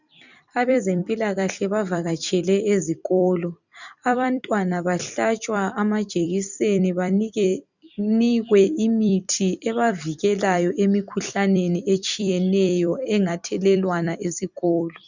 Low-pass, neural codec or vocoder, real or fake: 7.2 kHz; vocoder, 44.1 kHz, 128 mel bands every 256 samples, BigVGAN v2; fake